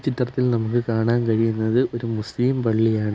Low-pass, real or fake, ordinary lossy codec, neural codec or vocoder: none; real; none; none